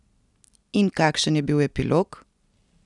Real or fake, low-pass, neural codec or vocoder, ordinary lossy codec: real; 10.8 kHz; none; none